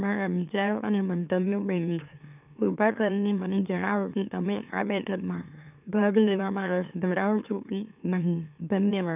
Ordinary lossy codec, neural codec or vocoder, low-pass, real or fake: none; autoencoder, 44.1 kHz, a latent of 192 numbers a frame, MeloTTS; 3.6 kHz; fake